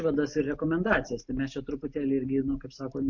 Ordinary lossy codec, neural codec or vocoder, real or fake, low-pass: MP3, 48 kbps; none; real; 7.2 kHz